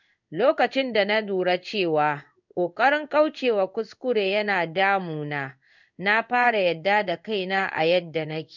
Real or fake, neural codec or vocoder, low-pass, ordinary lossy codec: fake; codec, 16 kHz in and 24 kHz out, 1 kbps, XY-Tokenizer; 7.2 kHz; none